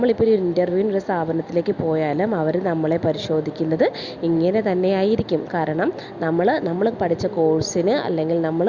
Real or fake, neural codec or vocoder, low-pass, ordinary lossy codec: real; none; 7.2 kHz; Opus, 64 kbps